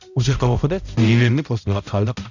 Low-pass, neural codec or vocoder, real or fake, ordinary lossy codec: 7.2 kHz; codec, 16 kHz, 0.5 kbps, X-Codec, HuBERT features, trained on balanced general audio; fake; none